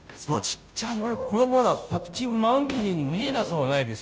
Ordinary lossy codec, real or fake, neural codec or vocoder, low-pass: none; fake; codec, 16 kHz, 0.5 kbps, FunCodec, trained on Chinese and English, 25 frames a second; none